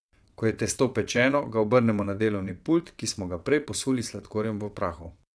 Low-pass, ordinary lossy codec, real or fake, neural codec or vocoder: none; none; fake; vocoder, 22.05 kHz, 80 mel bands, WaveNeXt